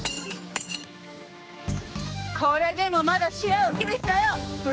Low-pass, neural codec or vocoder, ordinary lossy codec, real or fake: none; codec, 16 kHz, 2 kbps, X-Codec, HuBERT features, trained on general audio; none; fake